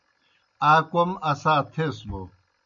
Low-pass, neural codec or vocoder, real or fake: 7.2 kHz; none; real